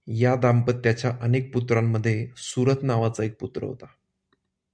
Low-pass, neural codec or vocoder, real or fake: 9.9 kHz; none; real